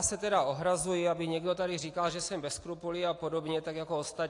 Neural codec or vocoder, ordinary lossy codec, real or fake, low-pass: none; AAC, 48 kbps; real; 10.8 kHz